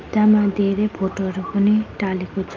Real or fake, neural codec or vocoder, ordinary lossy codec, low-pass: real; none; Opus, 24 kbps; 7.2 kHz